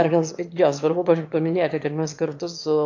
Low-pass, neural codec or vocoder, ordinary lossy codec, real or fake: 7.2 kHz; autoencoder, 22.05 kHz, a latent of 192 numbers a frame, VITS, trained on one speaker; AAC, 48 kbps; fake